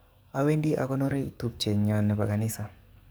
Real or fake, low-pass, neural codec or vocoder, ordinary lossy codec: fake; none; codec, 44.1 kHz, 7.8 kbps, DAC; none